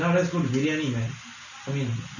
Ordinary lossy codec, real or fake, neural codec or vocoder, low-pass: none; real; none; 7.2 kHz